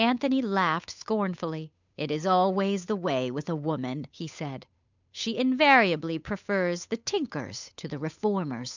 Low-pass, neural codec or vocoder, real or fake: 7.2 kHz; codec, 16 kHz, 8 kbps, FunCodec, trained on Chinese and English, 25 frames a second; fake